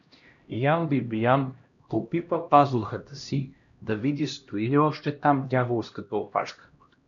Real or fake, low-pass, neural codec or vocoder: fake; 7.2 kHz; codec, 16 kHz, 1 kbps, X-Codec, HuBERT features, trained on LibriSpeech